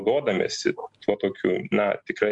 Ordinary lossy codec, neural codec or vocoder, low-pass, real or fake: MP3, 64 kbps; none; 10.8 kHz; real